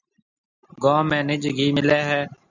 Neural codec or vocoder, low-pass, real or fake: none; 7.2 kHz; real